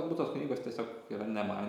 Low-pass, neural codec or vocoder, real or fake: 19.8 kHz; none; real